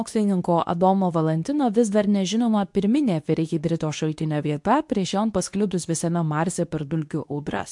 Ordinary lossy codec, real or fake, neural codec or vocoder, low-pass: MP3, 64 kbps; fake; codec, 24 kHz, 0.9 kbps, WavTokenizer, medium speech release version 2; 10.8 kHz